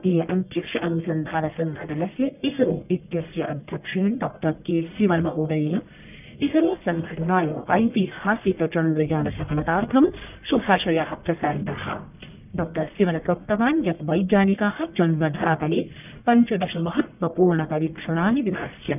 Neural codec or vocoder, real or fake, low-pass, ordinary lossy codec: codec, 44.1 kHz, 1.7 kbps, Pupu-Codec; fake; 3.6 kHz; none